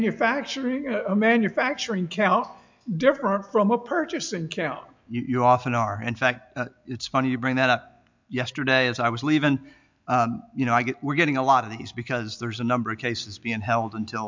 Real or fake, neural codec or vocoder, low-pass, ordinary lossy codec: real; none; 7.2 kHz; MP3, 64 kbps